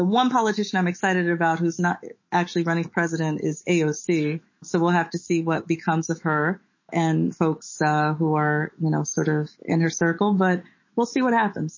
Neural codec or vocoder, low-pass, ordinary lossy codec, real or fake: autoencoder, 48 kHz, 128 numbers a frame, DAC-VAE, trained on Japanese speech; 7.2 kHz; MP3, 32 kbps; fake